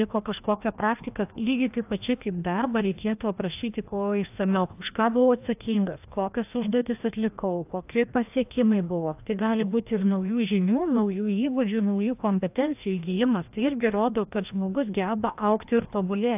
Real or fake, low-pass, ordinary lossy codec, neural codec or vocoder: fake; 3.6 kHz; AAC, 32 kbps; codec, 16 kHz, 1 kbps, FreqCodec, larger model